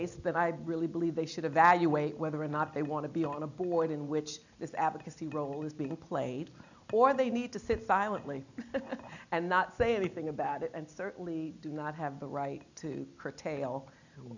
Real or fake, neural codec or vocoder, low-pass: real; none; 7.2 kHz